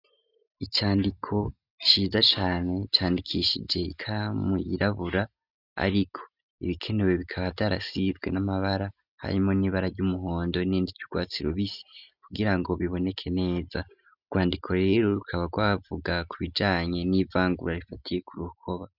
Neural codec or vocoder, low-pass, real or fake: none; 5.4 kHz; real